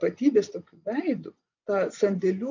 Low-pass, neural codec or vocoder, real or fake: 7.2 kHz; vocoder, 24 kHz, 100 mel bands, Vocos; fake